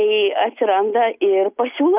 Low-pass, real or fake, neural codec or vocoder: 3.6 kHz; real; none